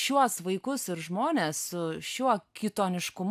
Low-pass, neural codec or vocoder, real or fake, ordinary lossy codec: 14.4 kHz; none; real; AAC, 96 kbps